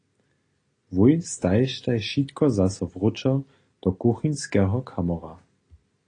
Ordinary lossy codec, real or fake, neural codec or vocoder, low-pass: AAC, 32 kbps; real; none; 9.9 kHz